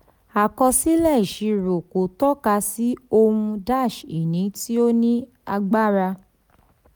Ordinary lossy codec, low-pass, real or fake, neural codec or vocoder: none; none; real; none